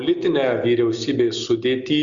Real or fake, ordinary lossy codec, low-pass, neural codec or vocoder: real; Opus, 64 kbps; 7.2 kHz; none